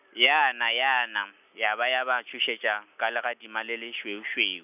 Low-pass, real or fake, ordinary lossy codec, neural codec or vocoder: 3.6 kHz; real; none; none